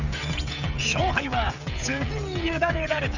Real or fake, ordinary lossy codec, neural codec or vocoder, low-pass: fake; none; codec, 16 kHz, 16 kbps, FreqCodec, smaller model; 7.2 kHz